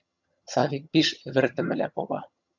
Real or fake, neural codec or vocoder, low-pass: fake; vocoder, 22.05 kHz, 80 mel bands, HiFi-GAN; 7.2 kHz